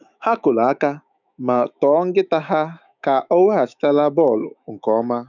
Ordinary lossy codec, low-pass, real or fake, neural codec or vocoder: none; 7.2 kHz; fake; autoencoder, 48 kHz, 128 numbers a frame, DAC-VAE, trained on Japanese speech